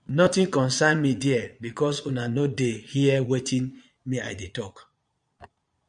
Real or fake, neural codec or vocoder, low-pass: fake; vocoder, 22.05 kHz, 80 mel bands, Vocos; 9.9 kHz